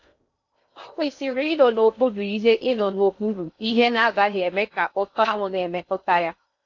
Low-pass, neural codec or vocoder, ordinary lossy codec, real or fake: 7.2 kHz; codec, 16 kHz in and 24 kHz out, 0.6 kbps, FocalCodec, streaming, 2048 codes; AAC, 48 kbps; fake